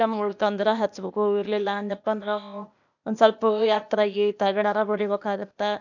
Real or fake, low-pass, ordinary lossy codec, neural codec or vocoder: fake; 7.2 kHz; none; codec, 16 kHz, 0.8 kbps, ZipCodec